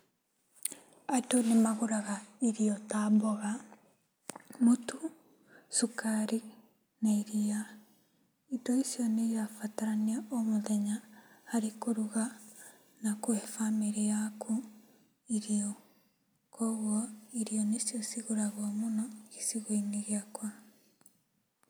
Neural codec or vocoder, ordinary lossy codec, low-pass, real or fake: none; none; none; real